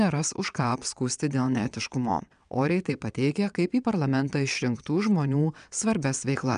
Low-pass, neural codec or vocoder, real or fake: 9.9 kHz; vocoder, 22.05 kHz, 80 mel bands, WaveNeXt; fake